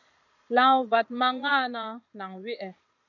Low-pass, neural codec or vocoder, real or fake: 7.2 kHz; vocoder, 22.05 kHz, 80 mel bands, Vocos; fake